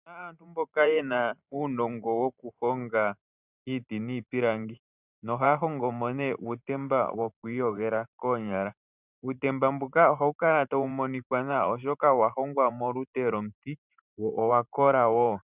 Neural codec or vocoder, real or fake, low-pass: vocoder, 44.1 kHz, 128 mel bands every 512 samples, BigVGAN v2; fake; 3.6 kHz